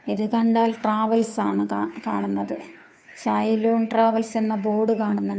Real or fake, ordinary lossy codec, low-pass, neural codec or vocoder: fake; none; none; codec, 16 kHz, 2 kbps, FunCodec, trained on Chinese and English, 25 frames a second